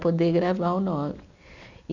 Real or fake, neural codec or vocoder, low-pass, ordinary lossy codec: real; none; 7.2 kHz; none